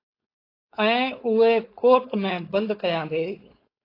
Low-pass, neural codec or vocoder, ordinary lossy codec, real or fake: 5.4 kHz; codec, 16 kHz, 4.8 kbps, FACodec; AAC, 32 kbps; fake